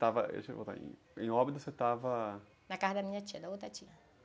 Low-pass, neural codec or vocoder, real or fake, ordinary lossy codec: none; none; real; none